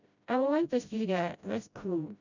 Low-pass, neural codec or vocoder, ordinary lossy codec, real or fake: 7.2 kHz; codec, 16 kHz, 0.5 kbps, FreqCodec, smaller model; none; fake